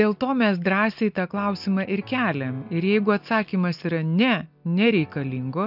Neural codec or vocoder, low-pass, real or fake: none; 5.4 kHz; real